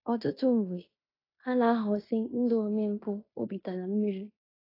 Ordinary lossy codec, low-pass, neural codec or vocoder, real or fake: AAC, 24 kbps; 5.4 kHz; codec, 16 kHz in and 24 kHz out, 0.9 kbps, LongCat-Audio-Codec, fine tuned four codebook decoder; fake